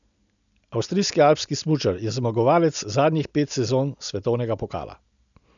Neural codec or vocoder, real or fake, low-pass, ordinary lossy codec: none; real; 7.2 kHz; none